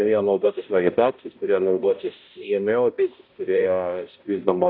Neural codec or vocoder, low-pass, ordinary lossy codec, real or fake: codec, 16 kHz, 0.5 kbps, X-Codec, HuBERT features, trained on balanced general audio; 5.4 kHz; AAC, 48 kbps; fake